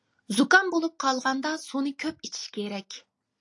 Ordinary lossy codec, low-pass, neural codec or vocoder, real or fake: AAC, 48 kbps; 10.8 kHz; none; real